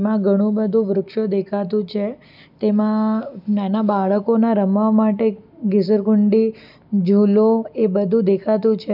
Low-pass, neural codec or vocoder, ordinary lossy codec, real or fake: 5.4 kHz; none; none; real